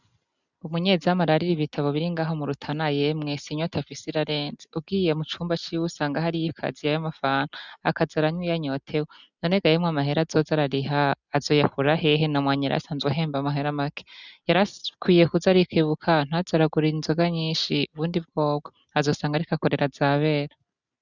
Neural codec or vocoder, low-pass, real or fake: none; 7.2 kHz; real